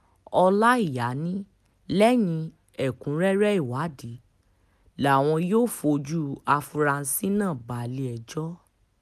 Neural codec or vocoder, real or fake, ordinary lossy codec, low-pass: none; real; none; 14.4 kHz